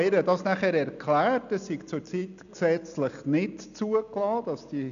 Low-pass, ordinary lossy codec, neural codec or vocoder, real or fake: 7.2 kHz; none; none; real